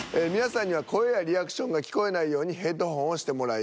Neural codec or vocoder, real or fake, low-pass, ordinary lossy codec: none; real; none; none